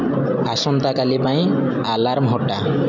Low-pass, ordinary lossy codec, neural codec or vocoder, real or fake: 7.2 kHz; none; none; real